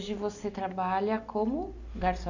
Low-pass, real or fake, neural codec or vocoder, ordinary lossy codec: 7.2 kHz; real; none; none